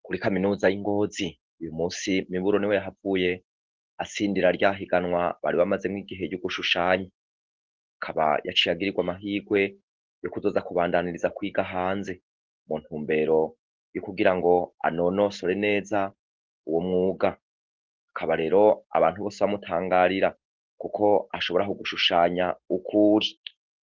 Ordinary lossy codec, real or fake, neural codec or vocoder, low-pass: Opus, 16 kbps; real; none; 7.2 kHz